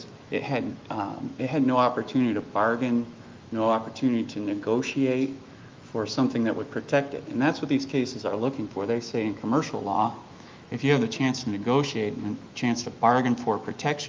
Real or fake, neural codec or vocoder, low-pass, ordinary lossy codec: fake; autoencoder, 48 kHz, 128 numbers a frame, DAC-VAE, trained on Japanese speech; 7.2 kHz; Opus, 24 kbps